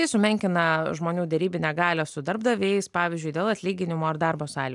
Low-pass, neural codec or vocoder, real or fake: 10.8 kHz; none; real